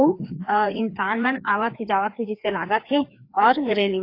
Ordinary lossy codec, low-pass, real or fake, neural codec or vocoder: AAC, 32 kbps; 5.4 kHz; fake; codec, 16 kHz, 2 kbps, FreqCodec, larger model